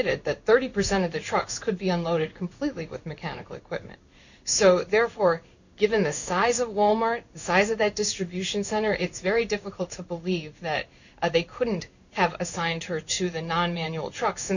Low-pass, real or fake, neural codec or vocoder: 7.2 kHz; fake; codec, 16 kHz in and 24 kHz out, 1 kbps, XY-Tokenizer